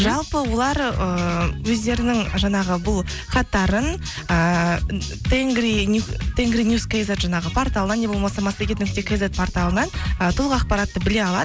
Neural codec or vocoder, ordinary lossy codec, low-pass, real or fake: none; none; none; real